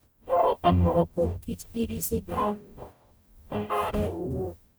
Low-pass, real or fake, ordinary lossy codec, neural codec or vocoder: none; fake; none; codec, 44.1 kHz, 0.9 kbps, DAC